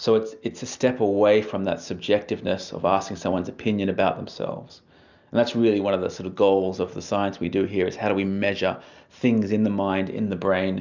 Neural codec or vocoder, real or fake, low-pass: none; real; 7.2 kHz